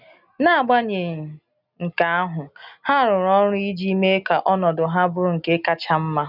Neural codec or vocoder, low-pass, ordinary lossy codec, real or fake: none; 5.4 kHz; none; real